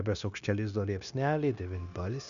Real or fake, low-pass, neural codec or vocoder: fake; 7.2 kHz; codec, 16 kHz, 0.9 kbps, LongCat-Audio-Codec